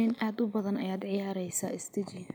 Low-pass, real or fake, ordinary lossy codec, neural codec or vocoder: none; real; none; none